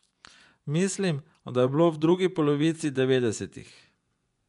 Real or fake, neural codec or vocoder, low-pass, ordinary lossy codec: fake; vocoder, 24 kHz, 100 mel bands, Vocos; 10.8 kHz; none